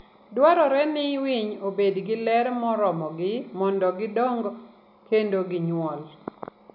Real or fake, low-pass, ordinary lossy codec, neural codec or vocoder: real; 5.4 kHz; none; none